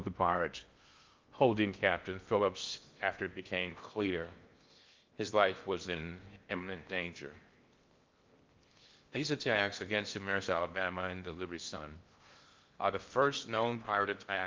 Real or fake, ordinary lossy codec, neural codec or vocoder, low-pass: fake; Opus, 24 kbps; codec, 16 kHz in and 24 kHz out, 0.6 kbps, FocalCodec, streaming, 4096 codes; 7.2 kHz